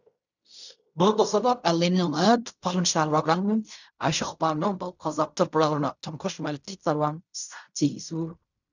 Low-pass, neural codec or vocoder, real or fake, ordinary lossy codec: 7.2 kHz; codec, 16 kHz in and 24 kHz out, 0.4 kbps, LongCat-Audio-Codec, fine tuned four codebook decoder; fake; none